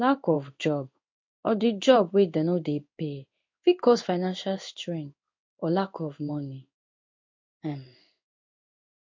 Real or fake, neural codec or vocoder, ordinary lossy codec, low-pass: fake; codec, 16 kHz in and 24 kHz out, 1 kbps, XY-Tokenizer; MP3, 48 kbps; 7.2 kHz